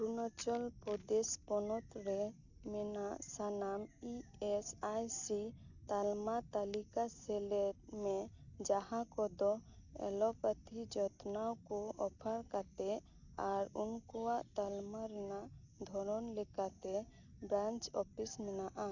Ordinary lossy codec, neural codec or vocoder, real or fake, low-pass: none; none; real; 7.2 kHz